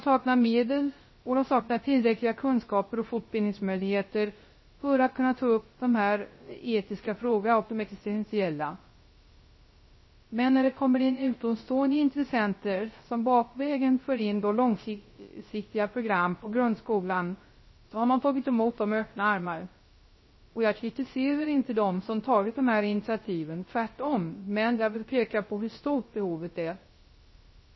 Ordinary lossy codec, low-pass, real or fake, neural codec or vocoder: MP3, 24 kbps; 7.2 kHz; fake; codec, 16 kHz, 0.3 kbps, FocalCodec